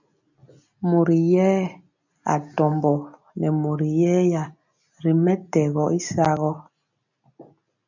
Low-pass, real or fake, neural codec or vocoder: 7.2 kHz; real; none